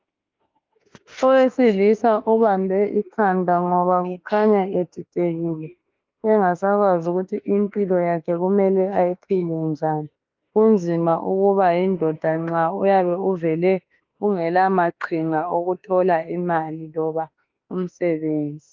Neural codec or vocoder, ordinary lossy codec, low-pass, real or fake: autoencoder, 48 kHz, 32 numbers a frame, DAC-VAE, trained on Japanese speech; Opus, 24 kbps; 7.2 kHz; fake